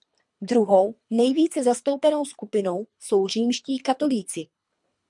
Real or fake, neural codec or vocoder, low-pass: fake; codec, 24 kHz, 3 kbps, HILCodec; 10.8 kHz